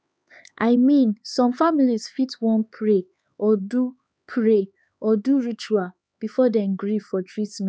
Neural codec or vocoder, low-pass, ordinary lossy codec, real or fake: codec, 16 kHz, 4 kbps, X-Codec, HuBERT features, trained on LibriSpeech; none; none; fake